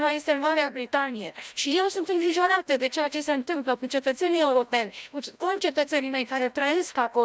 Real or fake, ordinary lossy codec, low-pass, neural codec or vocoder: fake; none; none; codec, 16 kHz, 0.5 kbps, FreqCodec, larger model